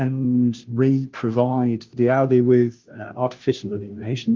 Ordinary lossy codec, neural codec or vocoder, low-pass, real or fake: Opus, 32 kbps; codec, 16 kHz, 0.5 kbps, FunCodec, trained on Chinese and English, 25 frames a second; 7.2 kHz; fake